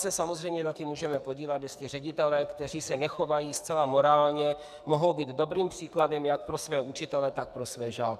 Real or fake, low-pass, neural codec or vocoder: fake; 14.4 kHz; codec, 44.1 kHz, 2.6 kbps, SNAC